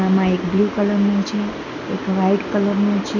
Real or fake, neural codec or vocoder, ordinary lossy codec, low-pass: real; none; none; 7.2 kHz